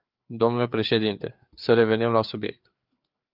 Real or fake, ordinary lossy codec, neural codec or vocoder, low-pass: fake; Opus, 32 kbps; codec, 16 kHz, 4 kbps, FreqCodec, larger model; 5.4 kHz